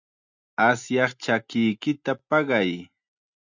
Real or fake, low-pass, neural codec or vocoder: real; 7.2 kHz; none